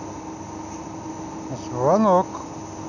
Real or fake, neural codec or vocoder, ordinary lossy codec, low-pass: real; none; none; 7.2 kHz